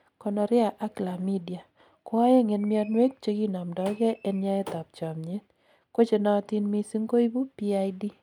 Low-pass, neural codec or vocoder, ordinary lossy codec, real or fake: 19.8 kHz; none; none; real